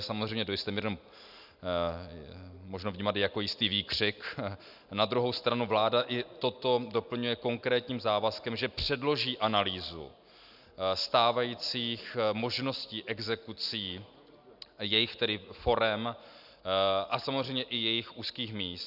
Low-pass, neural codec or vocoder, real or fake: 5.4 kHz; none; real